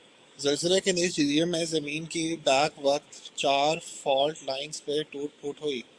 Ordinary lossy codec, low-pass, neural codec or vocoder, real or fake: Opus, 64 kbps; 9.9 kHz; codec, 44.1 kHz, 7.8 kbps, DAC; fake